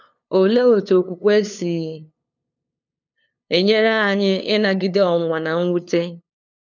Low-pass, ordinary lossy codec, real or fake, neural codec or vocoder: 7.2 kHz; none; fake; codec, 16 kHz, 2 kbps, FunCodec, trained on LibriTTS, 25 frames a second